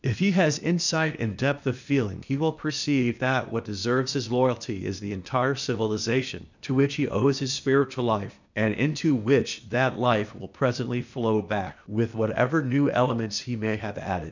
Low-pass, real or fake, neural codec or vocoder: 7.2 kHz; fake; codec, 16 kHz, 0.8 kbps, ZipCodec